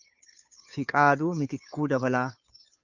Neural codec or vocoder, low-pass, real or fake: codec, 16 kHz, 2 kbps, FunCodec, trained on Chinese and English, 25 frames a second; 7.2 kHz; fake